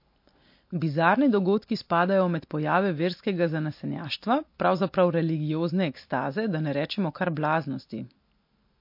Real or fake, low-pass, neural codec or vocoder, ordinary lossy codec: real; 5.4 kHz; none; MP3, 32 kbps